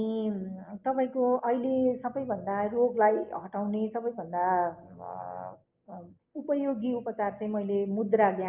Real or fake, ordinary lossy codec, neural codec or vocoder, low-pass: real; Opus, 24 kbps; none; 3.6 kHz